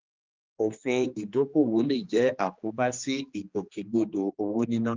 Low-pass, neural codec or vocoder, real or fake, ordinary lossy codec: 7.2 kHz; codec, 16 kHz, 2 kbps, X-Codec, HuBERT features, trained on general audio; fake; Opus, 32 kbps